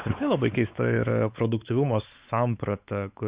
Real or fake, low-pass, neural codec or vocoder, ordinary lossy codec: fake; 3.6 kHz; codec, 16 kHz, 4 kbps, X-Codec, WavLM features, trained on Multilingual LibriSpeech; Opus, 64 kbps